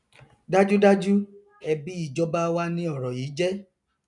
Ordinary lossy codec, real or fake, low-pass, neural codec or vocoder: none; real; 10.8 kHz; none